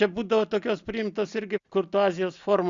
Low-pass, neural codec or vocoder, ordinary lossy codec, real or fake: 7.2 kHz; none; Opus, 64 kbps; real